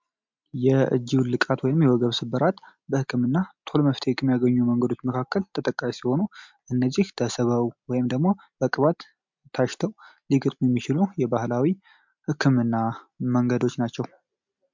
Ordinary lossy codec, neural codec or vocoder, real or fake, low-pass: MP3, 64 kbps; none; real; 7.2 kHz